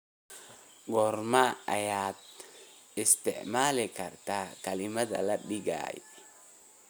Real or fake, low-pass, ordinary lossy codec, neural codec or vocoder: real; none; none; none